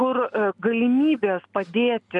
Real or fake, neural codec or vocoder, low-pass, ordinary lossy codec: real; none; 10.8 kHz; MP3, 96 kbps